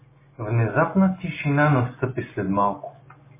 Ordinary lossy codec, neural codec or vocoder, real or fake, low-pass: MP3, 16 kbps; none; real; 3.6 kHz